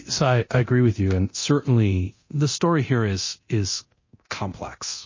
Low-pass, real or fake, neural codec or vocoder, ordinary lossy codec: 7.2 kHz; fake; codec, 24 kHz, 0.9 kbps, DualCodec; MP3, 32 kbps